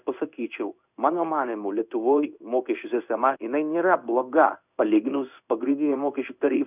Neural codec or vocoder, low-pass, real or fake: codec, 16 kHz in and 24 kHz out, 1 kbps, XY-Tokenizer; 3.6 kHz; fake